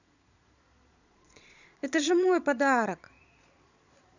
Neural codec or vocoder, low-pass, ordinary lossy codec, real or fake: vocoder, 22.05 kHz, 80 mel bands, Vocos; 7.2 kHz; none; fake